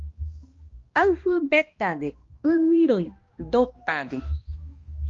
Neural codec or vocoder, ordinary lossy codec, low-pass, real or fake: codec, 16 kHz, 1 kbps, X-Codec, HuBERT features, trained on balanced general audio; Opus, 32 kbps; 7.2 kHz; fake